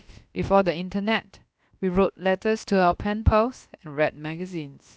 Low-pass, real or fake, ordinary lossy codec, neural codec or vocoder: none; fake; none; codec, 16 kHz, about 1 kbps, DyCAST, with the encoder's durations